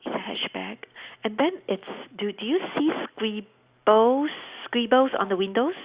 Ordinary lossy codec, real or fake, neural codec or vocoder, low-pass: Opus, 64 kbps; real; none; 3.6 kHz